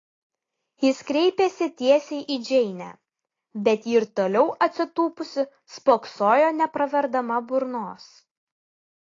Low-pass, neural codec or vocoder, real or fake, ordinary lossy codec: 7.2 kHz; none; real; AAC, 32 kbps